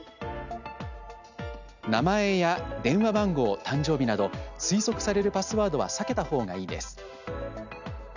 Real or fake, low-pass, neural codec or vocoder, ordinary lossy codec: real; 7.2 kHz; none; none